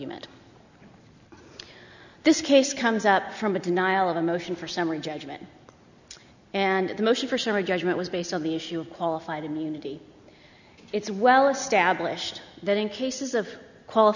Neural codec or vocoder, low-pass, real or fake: none; 7.2 kHz; real